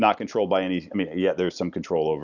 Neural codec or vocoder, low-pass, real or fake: none; 7.2 kHz; real